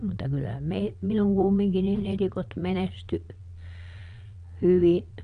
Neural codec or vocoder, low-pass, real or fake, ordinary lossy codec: vocoder, 22.05 kHz, 80 mel bands, Vocos; 9.9 kHz; fake; none